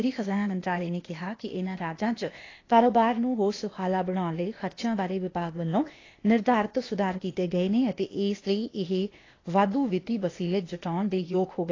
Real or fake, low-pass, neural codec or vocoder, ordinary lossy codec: fake; 7.2 kHz; codec, 16 kHz, 0.8 kbps, ZipCodec; AAC, 32 kbps